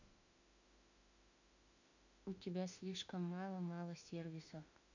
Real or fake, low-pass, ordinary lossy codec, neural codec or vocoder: fake; 7.2 kHz; none; autoencoder, 48 kHz, 32 numbers a frame, DAC-VAE, trained on Japanese speech